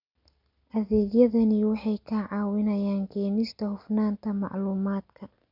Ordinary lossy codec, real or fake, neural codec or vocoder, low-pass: none; real; none; 5.4 kHz